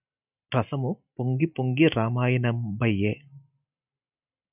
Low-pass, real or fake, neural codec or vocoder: 3.6 kHz; real; none